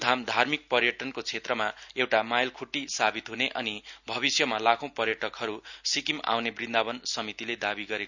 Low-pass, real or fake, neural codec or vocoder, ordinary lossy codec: 7.2 kHz; real; none; none